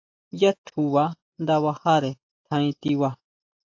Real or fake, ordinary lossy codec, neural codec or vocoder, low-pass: real; AAC, 48 kbps; none; 7.2 kHz